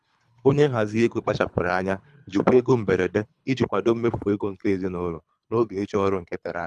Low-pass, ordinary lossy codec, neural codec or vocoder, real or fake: none; none; codec, 24 kHz, 3 kbps, HILCodec; fake